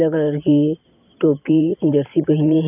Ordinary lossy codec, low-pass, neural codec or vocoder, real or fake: none; 3.6 kHz; vocoder, 44.1 kHz, 128 mel bands every 512 samples, BigVGAN v2; fake